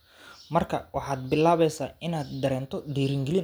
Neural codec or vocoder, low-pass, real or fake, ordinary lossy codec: none; none; real; none